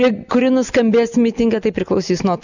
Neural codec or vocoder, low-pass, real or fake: none; 7.2 kHz; real